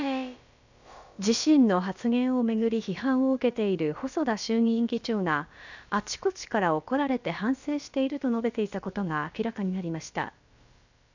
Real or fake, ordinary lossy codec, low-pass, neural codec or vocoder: fake; none; 7.2 kHz; codec, 16 kHz, about 1 kbps, DyCAST, with the encoder's durations